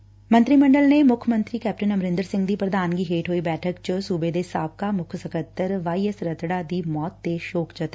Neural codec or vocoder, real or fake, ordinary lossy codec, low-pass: none; real; none; none